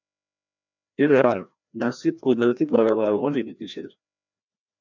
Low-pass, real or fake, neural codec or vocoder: 7.2 kHz; fake; codec, 16 kHz, 1 kbps, FreqCodec, larger model